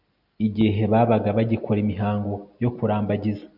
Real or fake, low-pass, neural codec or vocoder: real; 5.4 kHz; none